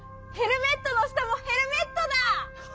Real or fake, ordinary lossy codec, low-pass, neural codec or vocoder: real; none; none; none